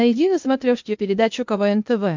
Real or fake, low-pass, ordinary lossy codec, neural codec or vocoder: fake; 7.2 kHz; MP3, 64 kbps; codec, 16 kHz, 0.8 kbps, ZipCodec